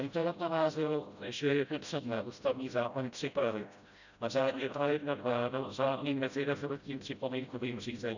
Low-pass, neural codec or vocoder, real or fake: 7.2 kHz; codec, 16 kHz, 0.5 kbps, FreqCodec, smaller model; fake